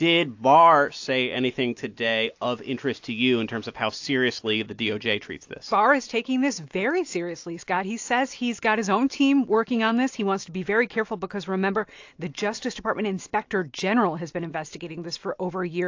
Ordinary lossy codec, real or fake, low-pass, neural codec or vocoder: AAC, 48 kbps; real; 7.2 kHz; none